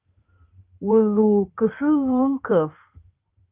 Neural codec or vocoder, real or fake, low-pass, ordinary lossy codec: codec, 16 kHz in and 24 kHz out, 2.2 kbps, FireRedTTS-2 codec; fake; 3.6 kHz; Opus, 24 kbps